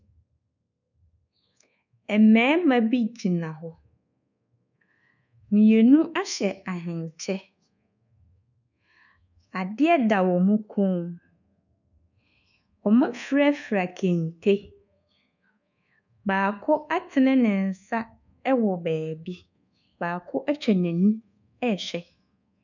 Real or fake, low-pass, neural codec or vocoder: fake; 7.2 kHz; codec, 24 kHz, 1.2 kbps, DualCodec